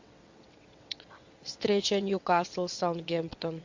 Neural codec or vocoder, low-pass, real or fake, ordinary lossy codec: vocoder, 22.05 kHz, 80 mel bands, WaveNeXt; 7.2 kHz; fake; MP3, 48 kbps